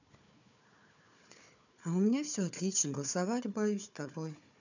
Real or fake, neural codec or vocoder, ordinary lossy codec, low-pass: fake; codec, 16 kHz, 4 kbps, FunCodec, trained on Chinese and English, 50 frames a second; none; 7.2 kHz